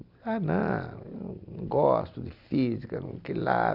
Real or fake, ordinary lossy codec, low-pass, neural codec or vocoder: real; none; 5.4 kHz; none